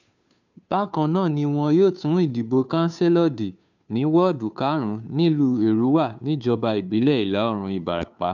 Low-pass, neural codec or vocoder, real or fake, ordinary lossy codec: 7.2 kHz; codec, 16 kHz, 2 kbps, FunCodec, trained on Chinese and English, 25 frames a second; fake; none